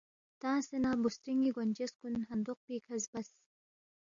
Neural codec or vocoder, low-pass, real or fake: none; 7.2 kHz; real